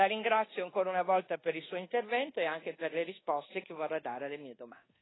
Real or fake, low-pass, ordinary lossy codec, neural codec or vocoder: fake; 7.2 kHz; AAC, 16 kbps; codec, 24 kHz, 1.2 kbps, DualCodec